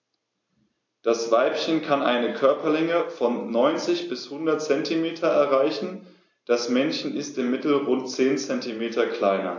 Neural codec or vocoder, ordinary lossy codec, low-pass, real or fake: none; AAC, 48 kbps; 7.2 kHz; real